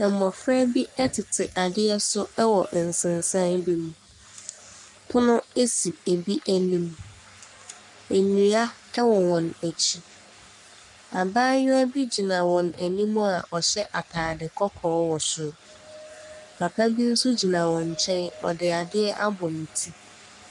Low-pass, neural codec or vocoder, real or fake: 10.8 kHz; codec, 44.1 kHz, 3.4 kbps, Pupu-Codec; fake